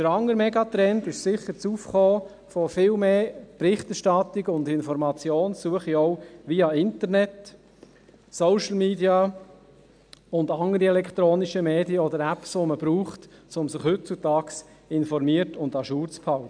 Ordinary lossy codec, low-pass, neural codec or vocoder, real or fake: none; 9.9 kHz; none; real